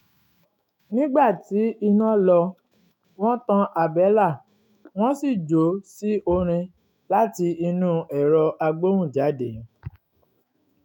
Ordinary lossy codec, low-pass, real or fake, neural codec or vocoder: none; 19.8 kHz; fake; autoencoder, 48 kHz, 128 numbers a frame, DAC-VAE, trained on Japanese speech